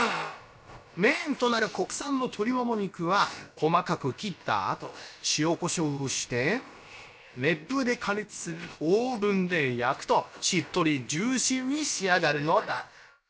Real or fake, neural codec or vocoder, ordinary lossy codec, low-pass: fake; codec, 16 kHz, about 1 kbps, DyCAST, with the encoder's durations; none; none